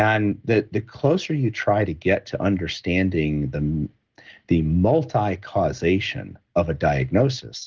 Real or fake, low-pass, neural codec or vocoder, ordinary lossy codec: real; 7.2 kHz; none; Opus, 16 kbps